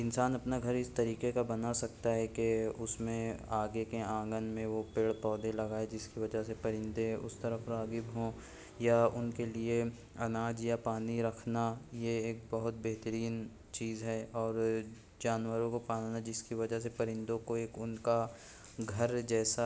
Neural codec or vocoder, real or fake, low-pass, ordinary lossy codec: none; real; none; none